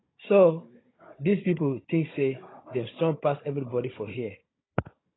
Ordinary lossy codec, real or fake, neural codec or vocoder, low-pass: AAC, 16 kbps; fake; codec, 16 kHz, 16 kbps, FunCodec, trained on Chinese and English, 50 frames a second; 7.2 kHz